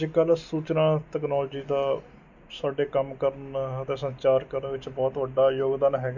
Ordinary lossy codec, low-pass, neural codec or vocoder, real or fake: none; 7.2 kHz; none; real